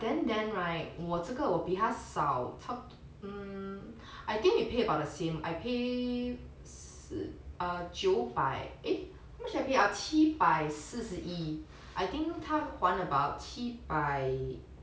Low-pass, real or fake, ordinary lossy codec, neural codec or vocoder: none; real; none; none